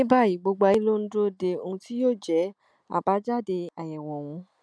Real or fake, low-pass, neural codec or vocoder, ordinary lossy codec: real; none; none; none